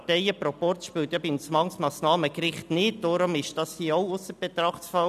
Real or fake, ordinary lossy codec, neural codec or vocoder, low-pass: real; none; none; 14.4 kHz